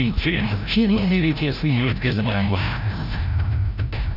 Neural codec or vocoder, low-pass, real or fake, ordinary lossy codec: codec, 16 kHz, 0.5 kbps, FreqCodec, larger model; 5.4 kHz; fake; none